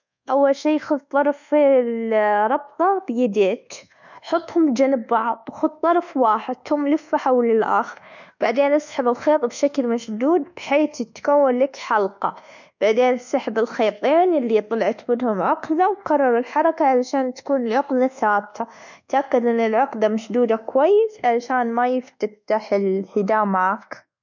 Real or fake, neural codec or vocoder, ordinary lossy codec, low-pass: fake; codec, 24 kHz, 1.2 kbps, DualCodec; none; 7.2 kHz